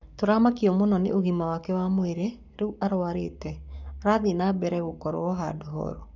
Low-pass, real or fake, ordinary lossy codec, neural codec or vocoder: 7.2 kHz; fake; none; codec, 44.1 kHz, 7.8 kbps, Pupu-Codec